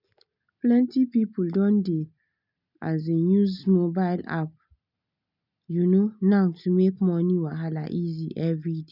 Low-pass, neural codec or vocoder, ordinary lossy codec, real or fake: 5.4 kHz; none; none; real